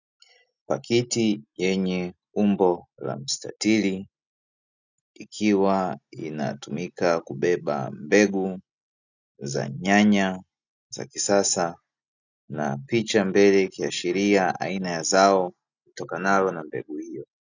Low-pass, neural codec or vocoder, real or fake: 7.2 kHz; none; real